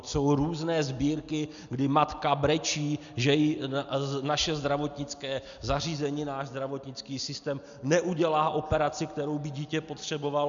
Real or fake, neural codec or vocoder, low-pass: real; none; 7.2 kHz